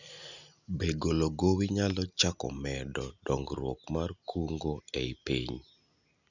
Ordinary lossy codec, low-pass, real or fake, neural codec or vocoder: none; 7.2 kHz; real; none